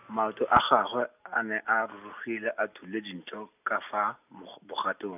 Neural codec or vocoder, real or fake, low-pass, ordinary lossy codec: none; real; 3.6 kHz; none